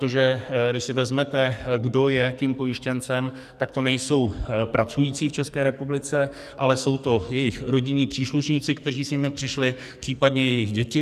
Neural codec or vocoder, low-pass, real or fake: codec, 44.1 kHz, 2.6 kbps, SNAC; 14.4 kHz; fake